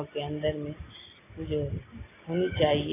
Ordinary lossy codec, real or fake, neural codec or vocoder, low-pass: AAC, 16 kbps; real; none; 3.6 kHz